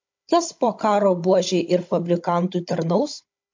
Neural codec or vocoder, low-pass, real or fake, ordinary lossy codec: codec, 16 kHz, 16 kbps, FunCodec, trained on Chinese and English, 50 frames a second; 7.2 kHz; fake; MP3, 48 kbps